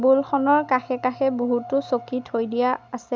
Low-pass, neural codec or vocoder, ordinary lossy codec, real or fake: none; none; none; real